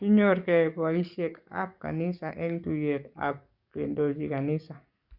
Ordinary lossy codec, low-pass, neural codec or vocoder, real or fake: Opus, 64 kbps; 5.4 kHz; codec, 44.1 kHz, 7.8 kbps, DAC; fake